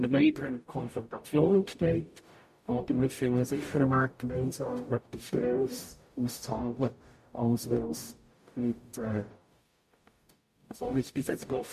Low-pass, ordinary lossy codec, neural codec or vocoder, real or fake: 14.4 kHz; MP3, 64 kbps; codec, 44.1 kHz, 0.9 kbps, DAC; fake